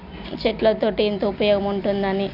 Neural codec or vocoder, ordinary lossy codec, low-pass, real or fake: none; none; 5.4 kHz; real